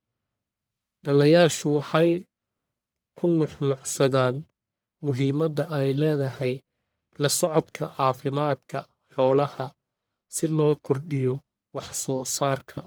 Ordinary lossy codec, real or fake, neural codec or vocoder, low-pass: none; fake; codec, 44.1 kHz, 1.7 kbps, Pupu-Codec; none